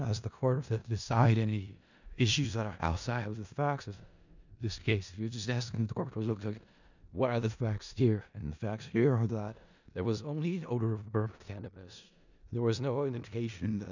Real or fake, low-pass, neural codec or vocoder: fake; 7.2 kHz; codec, 16 kHz in and 24 kHz out, 0.4 kbps, LongCat-Audio-Codec, four codebook decoder